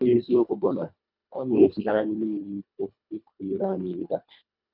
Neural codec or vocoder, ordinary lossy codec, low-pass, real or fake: codec, 24 kHz, 1.5 kbps, HILCodec; none; 5.4 kHz; fake